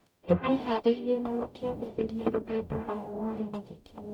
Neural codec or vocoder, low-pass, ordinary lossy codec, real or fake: codec, 44.1 kHz, 0.9 kbps, DAC; 19.8 kHz; none; fake